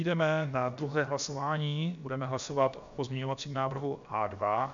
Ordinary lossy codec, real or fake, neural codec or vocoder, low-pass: MP3, 64 kbps; fake; codec, 16 kHz, about 1 kbps, DyCAST, with the encoder's durations; 7.2 kHz